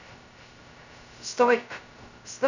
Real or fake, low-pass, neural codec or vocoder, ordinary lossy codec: fake; 7.2 kHz; codec, 16 kHz, 0.2 kbps, FocalCodec; none